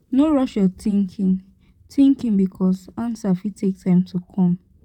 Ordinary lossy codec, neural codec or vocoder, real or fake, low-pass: none; vocoder, 44.1 kHz, 128 mel bands, Pupu-Vocoder; fake; 19.8 kHz